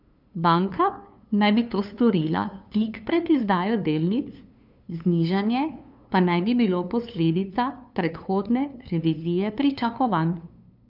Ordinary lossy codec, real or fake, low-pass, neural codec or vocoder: none; fake; 5.4 kHz; codec, 16 kHz, 2 kbps, FunCodec, trained on LibriTTS, 25 frames a second